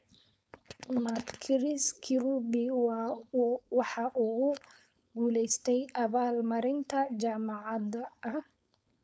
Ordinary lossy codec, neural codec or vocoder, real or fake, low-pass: none; codec, 16 kHz, 4.8 kbps, FACodec; fake; none